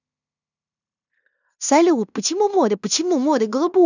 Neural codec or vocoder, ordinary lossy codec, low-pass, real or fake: codec, 16 kHz in and 24 kHz out, 0.9 kbps, LongCat-Audio-Codec, fine tuned four codebook decoder; none; 7.2 kHz; fake